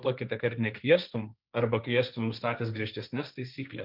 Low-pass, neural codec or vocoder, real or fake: 5.4 kHz; codec, 16 kHz, 1.1 kbps, Voila-Tokenizer; fake